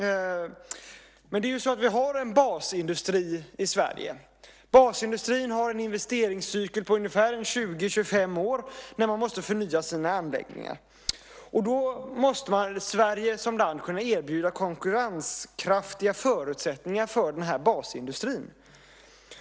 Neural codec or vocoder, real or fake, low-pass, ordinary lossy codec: none; real; none; none